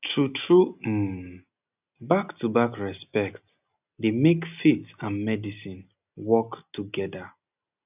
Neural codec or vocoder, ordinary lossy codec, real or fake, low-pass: none; none; real; 3.6 kHz